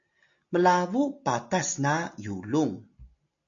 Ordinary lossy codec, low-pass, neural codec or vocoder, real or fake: MP3, 48 kbps; 7.2 kHz; none; real